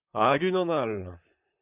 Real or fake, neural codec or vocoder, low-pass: fake; vocoder, 44.1 kHz, 128 mel bands, Pupu-Vocoder; 3.6 kHz